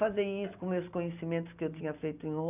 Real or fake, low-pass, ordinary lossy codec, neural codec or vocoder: real; 3.6 kHz; none; none